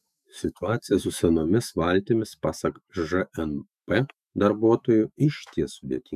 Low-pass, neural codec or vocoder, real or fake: 14.4 kHz; autoencoder, 48 kHz, 128 numbers a frame, DAC-VAE, trained on Japanese speech; fake